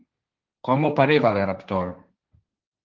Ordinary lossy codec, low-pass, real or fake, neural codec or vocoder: Opus, 32 kbps; 7.2 kHz; fake; codec, 16 kHz, 1.1 kbps, Voila-Tokenizer